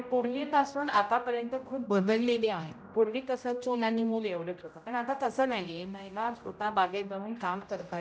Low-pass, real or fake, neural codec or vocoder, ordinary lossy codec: none; fake; codec, 16 kHz, 0.5 kbps, X-Codec, HuBERT features, trained on general audio; none